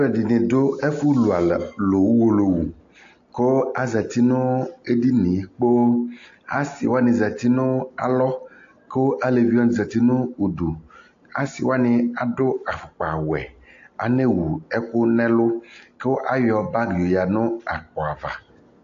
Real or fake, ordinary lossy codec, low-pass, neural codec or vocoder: real; AAC, 48 kbps; 7.2 kHz; none